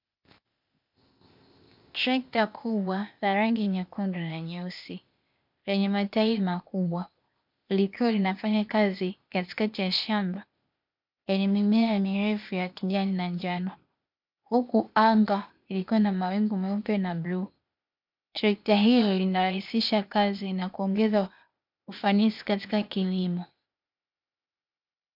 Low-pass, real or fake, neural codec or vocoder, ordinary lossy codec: 5.4 kHz; fake; codec, 16 kHz, 0.8 kbps, ZipCodec; MP3, 48 kbps